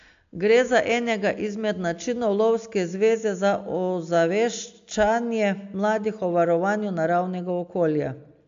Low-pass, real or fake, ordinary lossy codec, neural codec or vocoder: 7.2 kHz; real; AAC, 64 kbps; none